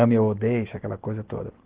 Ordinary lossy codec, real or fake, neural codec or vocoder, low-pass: Opus, 16 kbps; real; none; 3.6 kHz